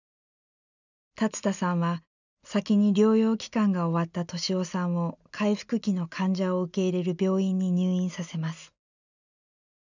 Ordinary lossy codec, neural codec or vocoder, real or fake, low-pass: none; none; real; 7.2 kHz